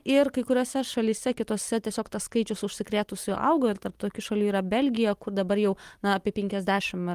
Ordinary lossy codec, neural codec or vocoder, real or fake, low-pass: Opus, 24 kbps; none; real; 14.4 kHz